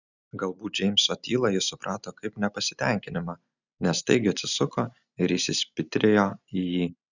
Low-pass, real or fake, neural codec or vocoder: 7.2 kHz; real; none